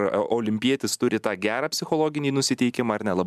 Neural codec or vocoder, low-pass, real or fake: none; 14.4 kHz; real